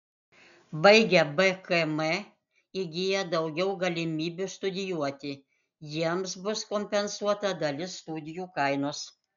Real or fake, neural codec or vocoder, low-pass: real; none; 7.2 kHz